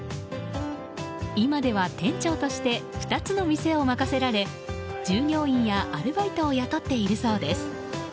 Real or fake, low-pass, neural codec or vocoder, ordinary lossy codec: real; none; none; none